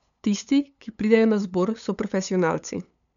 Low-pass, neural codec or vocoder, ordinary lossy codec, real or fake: 7.2 kHz; codec, 16 kHz, 8 kbps, FunCodec, trained on LibriTTS, 25 frames a second; none; fake